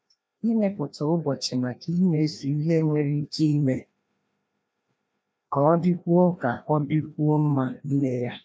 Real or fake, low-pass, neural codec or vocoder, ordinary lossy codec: fake; none; codec, 16 kHz, 1 kbps, FreqCodec, larger model; none